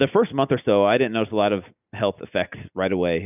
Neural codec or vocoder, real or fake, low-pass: none; real; 3.6 kHz